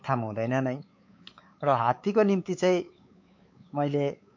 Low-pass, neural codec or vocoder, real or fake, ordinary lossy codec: 7.2 kHz; codec, 16 kHz, 4 kbps, X-Codec, WavLM features, trained on Multilingual LibriSpeech; fake; MP3, 48 kbps